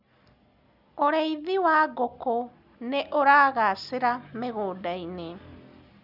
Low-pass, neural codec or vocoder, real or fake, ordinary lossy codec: 5.4 kHz; none; real; none